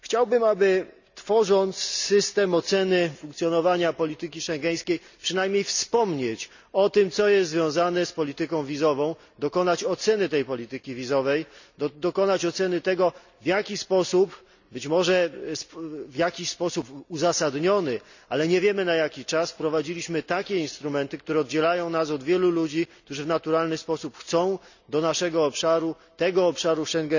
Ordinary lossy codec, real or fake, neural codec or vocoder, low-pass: none; real; none; 7.2 kHz